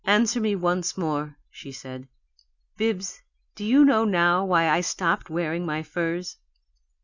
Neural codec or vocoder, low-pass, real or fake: none; 7.2 kHz; real